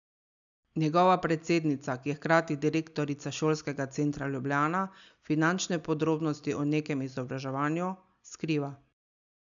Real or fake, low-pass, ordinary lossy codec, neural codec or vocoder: real; 7.2 kHz; none; none